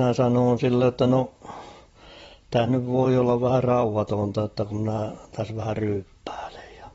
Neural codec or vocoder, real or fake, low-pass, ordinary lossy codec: vocoder, 44.1 kHz, 128 mel bands, Pupu-Vocoder; fake; 19.8 kHz; AAC, 24 kbps